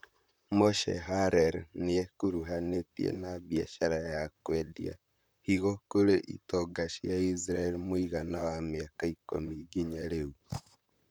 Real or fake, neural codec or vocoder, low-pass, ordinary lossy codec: fake; vocoder, 44.1 kHz, 128 mel bands, Pupu-Vocoder; none; none